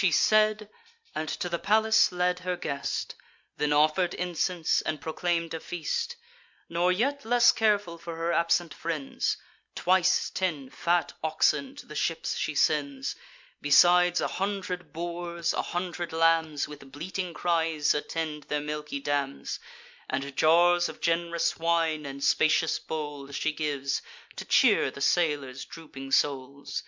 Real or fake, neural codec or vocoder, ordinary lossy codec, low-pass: real; none; MP3, 64 kbps; 7.2 kHz